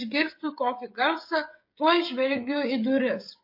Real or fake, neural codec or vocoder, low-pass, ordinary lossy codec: fake; codec, 16 kHz, 16 kbps, FreqCodec, smaller model; 5.4 kHz; MP3, 32 kbps